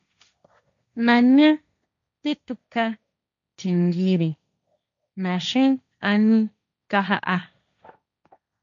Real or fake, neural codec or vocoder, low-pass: fake; codec, 16 kHz, 1.1 kbps, Voila-Tokenizer; 7.2 kHz